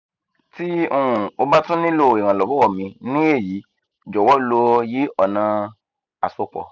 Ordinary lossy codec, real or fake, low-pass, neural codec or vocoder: none; real; 7.2 kHz; none